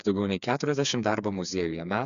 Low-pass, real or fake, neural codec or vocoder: 7.2 kHz; fake; codec, 16 kHz, 4 kbps, FreqCodec, smaller model